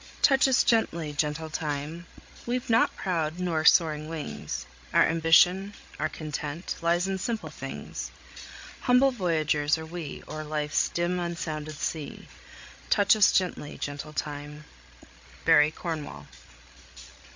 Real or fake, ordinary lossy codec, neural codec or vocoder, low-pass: fake; MP3, 48 kbps; codec, 16 kHz, 16 kbps, FreqCodec, larger model; 7.2 kHz